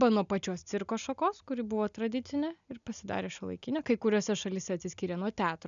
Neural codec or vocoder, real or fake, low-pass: none; real; 7.2 kHz